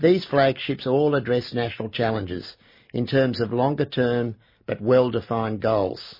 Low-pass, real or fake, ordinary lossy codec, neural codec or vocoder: 5.4 kHz; real; MP3, 24 kbps; none